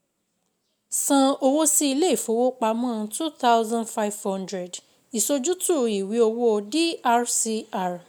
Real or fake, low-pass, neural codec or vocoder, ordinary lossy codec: real; none; none; none